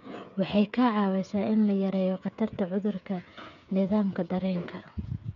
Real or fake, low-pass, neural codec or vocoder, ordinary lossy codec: fake; 7.2 kHz; codec, 16 kHz, 8 kbps, FreqCodec, smaller model; none